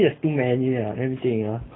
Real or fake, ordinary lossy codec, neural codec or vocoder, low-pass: real; AAC, 16 kbps; none; 7.2 kHz